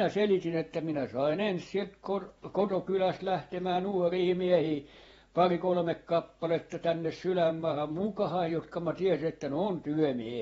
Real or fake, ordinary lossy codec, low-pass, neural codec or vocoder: fake; AAC, 24 kbps; 19.8 kHz; vocoder, 48 kHz, 128 mel bands, Vocos